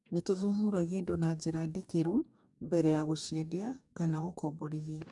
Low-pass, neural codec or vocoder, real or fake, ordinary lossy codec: 10.8 kHz; codec, 44.1 kHz, 2.6 kbps, DAC; fake; none